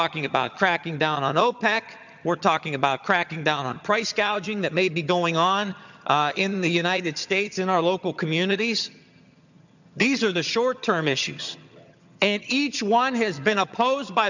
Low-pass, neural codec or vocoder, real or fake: 7.2 kHz; vocoder, 22.05 kHz, 80 mel bands, HiFi-GAN; fake